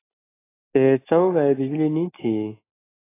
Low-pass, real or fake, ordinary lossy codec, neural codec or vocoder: 3.6 kHz; real; AAC, 16 kbps; none